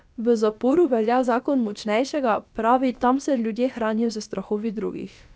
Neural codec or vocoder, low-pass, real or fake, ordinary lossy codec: codec, 16 kHz, about 1 kbps, DyCAST, with the encoder's durations; none; fake; none